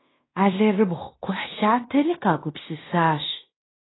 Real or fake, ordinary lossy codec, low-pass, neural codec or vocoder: fake; AAC, 16 kbps; 7.2 kHz; codec, 16 kHz in and 24 kHz out, 0.9 kbps, LongCat-Audio-Codec, fine tuned four codebook decoder